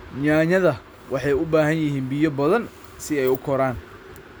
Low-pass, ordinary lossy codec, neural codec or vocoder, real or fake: none; none; none; real